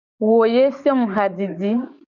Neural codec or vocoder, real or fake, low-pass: codec, 44.1 kHz, 7.8 kbps, DAC; fake; 7.2 kHz